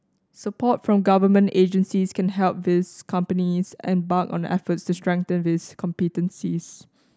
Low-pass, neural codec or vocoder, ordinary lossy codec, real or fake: none; none; none; real